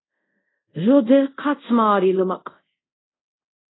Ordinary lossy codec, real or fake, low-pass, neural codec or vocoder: AAC, 16 kbps; fake; 7.2 kHz; codec, 24 kHz, 0.5 kbps, DualCodec